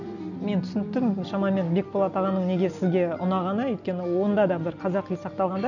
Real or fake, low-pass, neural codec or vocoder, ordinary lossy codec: real; 7.2 kHz; none; none